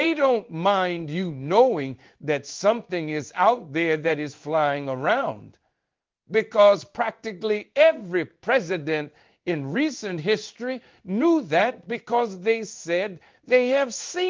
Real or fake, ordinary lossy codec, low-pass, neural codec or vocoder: fake; Opus, 32 kbps; 7.2 kHz; codec, 16 kHz in and 24 kHz out, 1 kbps, XY-Tokenizer